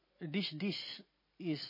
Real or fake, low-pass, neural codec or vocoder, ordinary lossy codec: real; 5.4 kHz; none; MP3, 24 kbps